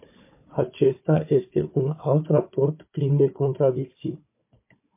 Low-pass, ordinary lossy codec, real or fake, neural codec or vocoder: 3.6 kHz; MP3, 24 kbps; fake; codec, 16 kHz, 4 kbps, FunCodec, trained on Chinese and English, 50 frames a second